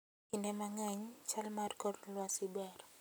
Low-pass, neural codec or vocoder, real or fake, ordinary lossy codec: none; none; real; none